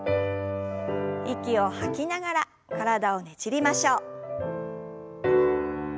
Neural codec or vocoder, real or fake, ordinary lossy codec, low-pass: none; real; none; none